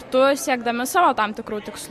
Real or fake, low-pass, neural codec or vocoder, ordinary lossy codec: real; 14.4 kHz; none; MP3, 64 kbps